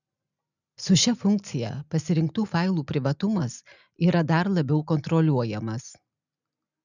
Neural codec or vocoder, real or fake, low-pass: none; real; 7.2 kHz